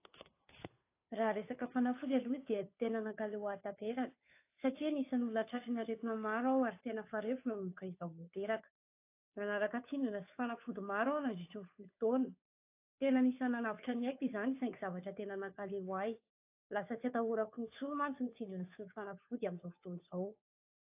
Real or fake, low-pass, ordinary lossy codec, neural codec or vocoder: fake; 3.6 kHz; AAC, 24 kbps; codec, 16 kHz, 2 kbps, FunCodec, trained on Chinese and English, 25 frames a second